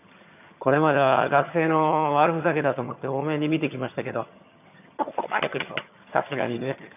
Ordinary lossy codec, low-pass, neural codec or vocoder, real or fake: none; 3.6 kHz; vocoder, 22.05 kHz, 80 mel bands, HiFi-GAN; fake